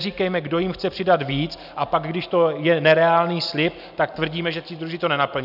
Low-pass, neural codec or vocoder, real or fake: 5.4 kHz; none; real